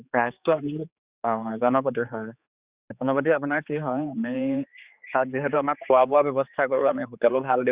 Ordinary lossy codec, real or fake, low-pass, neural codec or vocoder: Opus, 64 kbps; fake; 3.6 kHz; codec, 16 kHz, 4 kbps, X-Codec, HuBERT features, trained on general audio